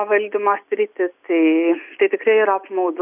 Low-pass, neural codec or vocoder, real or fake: 3.6 kHz; none; real